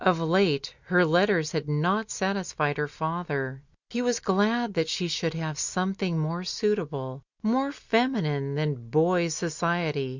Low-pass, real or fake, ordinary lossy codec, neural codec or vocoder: 7.2 kHz; real; Opus, 64 kbps; none